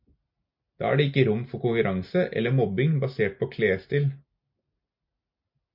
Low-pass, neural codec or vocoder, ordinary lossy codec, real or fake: 5.4 kHz; none; MP3, 48 kbps; real